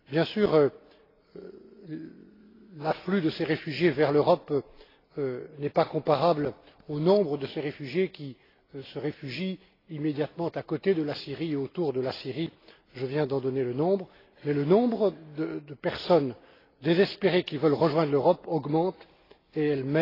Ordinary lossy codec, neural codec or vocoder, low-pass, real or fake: AAC, 24 kbps; none; 5.4 kHz; real